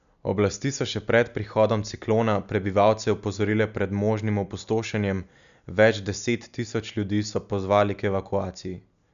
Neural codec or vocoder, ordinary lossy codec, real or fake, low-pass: none; none; real; 7.2 kHz